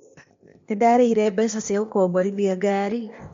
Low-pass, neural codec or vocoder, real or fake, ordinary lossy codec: 7.2 kHz; codec, 16 kHz, 0.8 kbps, ZipCodec; fake; MP3, 48 kbps